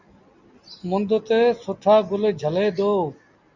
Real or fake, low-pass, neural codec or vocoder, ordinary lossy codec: real; 7.2 kHz; none; Opus, 64 kbps